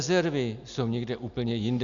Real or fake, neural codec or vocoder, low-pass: real; none; 7.2 kHz